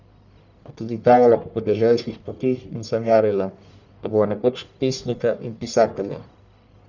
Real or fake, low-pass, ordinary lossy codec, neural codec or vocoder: fake; 7.2 kHz; none; codec, 44.1 kHz, 1.7 kbps, Pupu-Codec